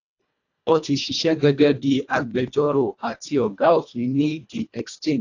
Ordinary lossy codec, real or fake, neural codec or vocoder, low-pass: AAC, 48 kbps; fake; codec, 24 kHz, 1.5 kbps, HILCodec; 7.2 kHz